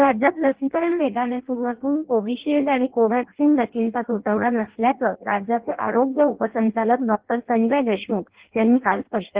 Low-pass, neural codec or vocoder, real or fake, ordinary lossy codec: 3.6 kHz; codec, 16 kHz in and 24 kHz out, 0.6 kbps, FireRedTTS-2 codec; fake; Opus, 16 kbps